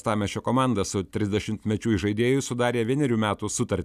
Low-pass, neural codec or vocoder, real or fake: 14.4 kHz; none; real